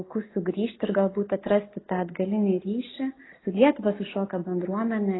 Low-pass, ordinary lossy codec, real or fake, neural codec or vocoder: 7.2 kHz; AAC, 16 kbps; real; none